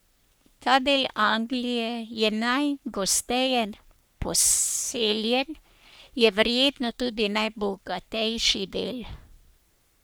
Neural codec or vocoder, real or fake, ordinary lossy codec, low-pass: codec, 44.1 kHz, 3.4 kbps, Pupu-Codec; fake; none; none